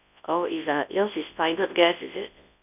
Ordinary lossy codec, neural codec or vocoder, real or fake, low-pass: none; codec, 24 kHz, 0.9 kbps, WavTokenizer, large speech release; fake; 3.6 kHz